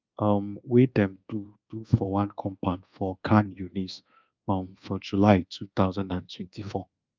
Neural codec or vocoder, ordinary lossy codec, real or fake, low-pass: codec, 24 kHz, 1.2 kbps, DualCodec; Opus, 24 kbps; fake; 7.2 kHz